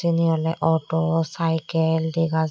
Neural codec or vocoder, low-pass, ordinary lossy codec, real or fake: none; none; none; real